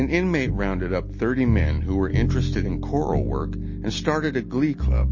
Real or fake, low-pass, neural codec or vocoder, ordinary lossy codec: real; 7.2 kHz; none; MP3, 32 kbps